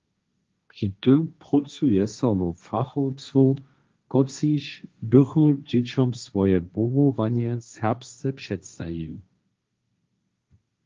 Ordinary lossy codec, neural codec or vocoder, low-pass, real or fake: Opus, 32 kbps; codec, 16 kHz, 1.1 kbps, Voila-Tokenizer; 7.2 kHz; fake